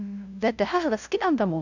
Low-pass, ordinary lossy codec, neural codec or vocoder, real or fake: 7.2 kHz; none; codec, 16 kHz, 0.5 kbps, FunCodec, trained on LibriTTS, 25 frames a second; fake